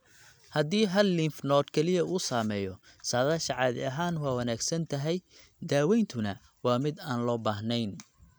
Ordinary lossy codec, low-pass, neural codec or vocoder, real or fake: none; none; none; real